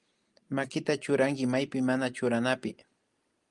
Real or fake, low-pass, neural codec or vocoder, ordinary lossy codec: real; 10.8 kHz; none; Opus, 32 kbps